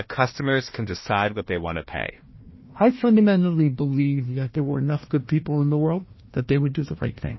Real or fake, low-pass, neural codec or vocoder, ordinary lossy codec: fake; 7.2 kHz; codec, 16 kHz, 1 kbps, FunCodec, trained on Chinese and English, 50 frames a second; MP3, 24 kbps